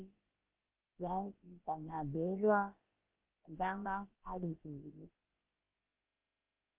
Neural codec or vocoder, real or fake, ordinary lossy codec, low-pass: codec, 16 kHz, about 1 kbps, DyCAST, with the encoder's durations; fake; Opus, 16 kbps; 3.6 kHz